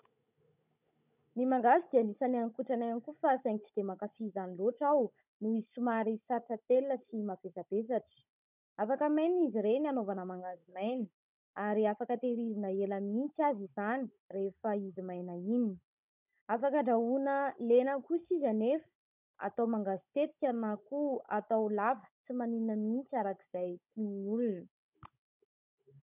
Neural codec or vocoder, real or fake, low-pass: codec, 16 kHz, 16 kbps, FunCodec, trained on Chinese and English, 50 frames a second; fake; 3.6 kHz